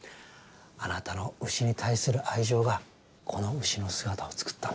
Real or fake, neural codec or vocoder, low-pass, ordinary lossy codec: real; none; none; none